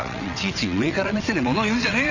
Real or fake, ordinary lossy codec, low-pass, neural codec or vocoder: fake; none; 7.2 kHz; vocoder, 22.05 kHz, 80 mel bands, WaveNeXt